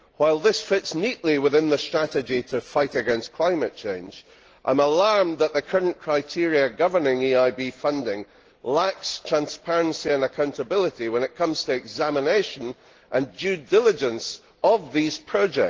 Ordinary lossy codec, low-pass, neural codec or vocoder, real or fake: Opus, 16 kbps; 7.2 kHz; none; real